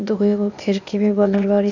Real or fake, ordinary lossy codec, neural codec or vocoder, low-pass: fake; none; codec, 16 kHz, 0.8 kbps, ZipCodec; 7.2 kHz